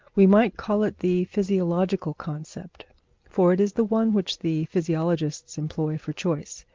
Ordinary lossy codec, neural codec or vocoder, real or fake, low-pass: Opus, 32 kbps; none; real; 7.2 kHz